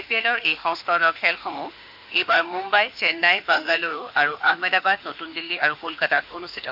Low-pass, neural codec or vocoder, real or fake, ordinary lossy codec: 5.4 kHz; autoencoder, 48 kHz, 32 numbers a frame, DAC-VAE, trained on Japanese speech; fake; none